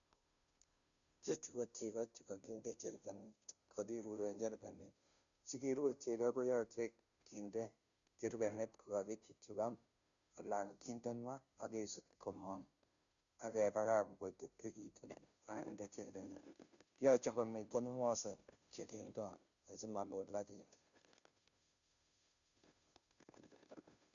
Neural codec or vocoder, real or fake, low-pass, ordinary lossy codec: codec, 16 kHz, 0.5 kbps, FunCodec, trained on Chinese and English, 25 frames a second; fake; 7.2 kHz; none